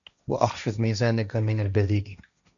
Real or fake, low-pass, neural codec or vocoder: fake; 7.2 kHz; codec, 16 kHz, 1.1 kbps, Voila-Tokenizer